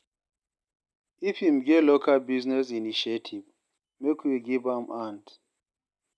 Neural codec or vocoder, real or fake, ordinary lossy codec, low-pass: none; real; none; none